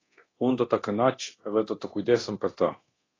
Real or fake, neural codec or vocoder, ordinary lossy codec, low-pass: fake; codec, 24 kHz, 0.9 kbps, DualCodec; AAC, 32 kbps; 7.2 kHz